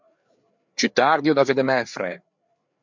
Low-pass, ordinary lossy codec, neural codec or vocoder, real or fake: 7.2 kHz; MP3, 64 kbps; codec, 16 kHz, 4 kbps, FreqCodec, larger model; fake